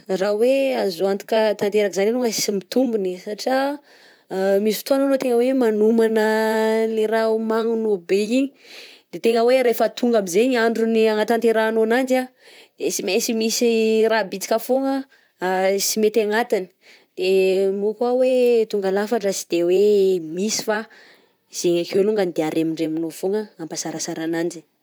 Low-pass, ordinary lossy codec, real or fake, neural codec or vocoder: none; none; fake; vocoder, 44.1 kHz, 128 mel bands, Pupu-Vocoder